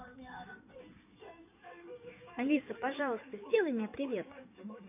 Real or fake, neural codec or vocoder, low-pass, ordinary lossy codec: fake; codec, 16 kHz in and 24 kHz out, 2.2 kbps, FireRedTTS-2 codec; 3.6 kHz; none